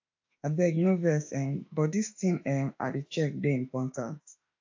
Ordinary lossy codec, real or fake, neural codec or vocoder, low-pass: AAC, 48 kbps; fake; autoencoder, 48 kHz, 32 numbers a frame, DAC-VAE, trained on Japanese speech; 7.2 kHz